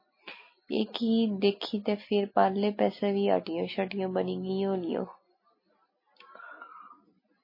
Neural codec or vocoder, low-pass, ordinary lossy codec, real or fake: none; 5.4 kHz; MP3, 24 kbps; real